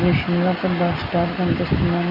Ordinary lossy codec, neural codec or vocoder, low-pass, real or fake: none; none; 5.4 kHz; real